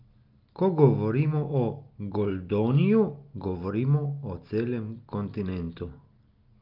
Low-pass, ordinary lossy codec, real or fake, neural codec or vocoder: 5.4 kHz; Opus, 24 kbps; real; none